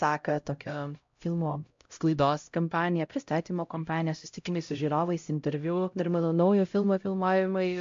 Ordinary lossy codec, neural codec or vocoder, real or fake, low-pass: MP3, 48 kbps; codec, 16 kHz, 0.5 kbps, X-Codec, HuBERT features, trained on LibriSpeech; fake; 7.2 kHz